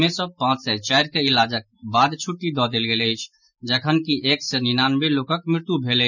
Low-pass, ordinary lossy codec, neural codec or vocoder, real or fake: 7.2 kHz; none; none; real